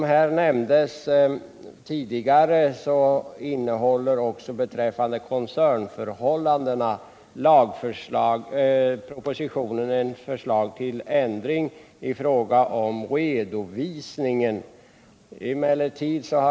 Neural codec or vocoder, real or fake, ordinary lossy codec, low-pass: none; real; none; none